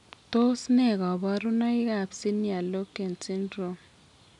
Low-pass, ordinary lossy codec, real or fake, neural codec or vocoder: 10.8 kHz; none; real; none